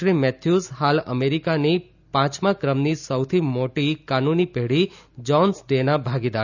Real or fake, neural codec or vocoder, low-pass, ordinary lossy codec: real; none; 7.2 kHz; none